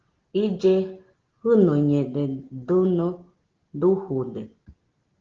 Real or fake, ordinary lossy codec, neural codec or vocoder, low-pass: real; Opus, 16 kbps; none; 7.2 kHz